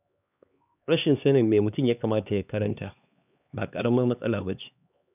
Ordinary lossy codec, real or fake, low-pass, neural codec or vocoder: none; fake; 3.6 kHz; codec, 16 kHz, 2 kbps, X-Codec, HuBERT features, trained on LibriSpeech